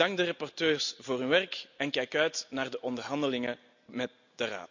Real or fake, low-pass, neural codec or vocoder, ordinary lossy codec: real; 7.2 kHz; none; none